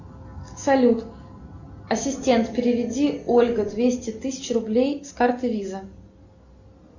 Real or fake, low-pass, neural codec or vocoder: real; 7.2 kHz; none